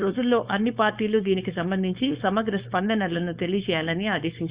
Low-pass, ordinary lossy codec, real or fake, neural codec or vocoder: 3.6 kHz; Opus, 64 kbps; fake; codec, 16 kHz, 4.8 kbps, FACodec